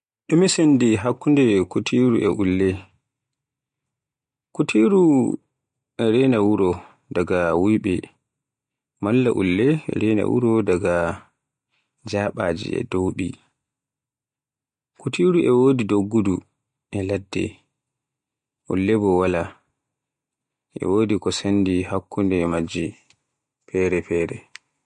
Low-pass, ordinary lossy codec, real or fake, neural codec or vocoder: 9.9 kHz; MP3, 48 kbps; real; none